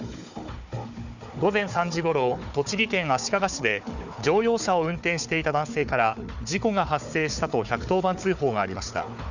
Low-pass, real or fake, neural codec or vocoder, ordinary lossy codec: 7.2 kHz; fake; codec, 16 kHz, 4 kbps, FunCodec, trained on Chinese and English, 50 frames a second; none